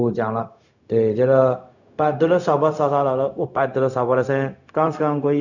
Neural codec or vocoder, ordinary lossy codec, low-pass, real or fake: codec, 16 kHz, 0.4 kbps, LongCat-Audio-Codec; none; 7.2 kHz; fake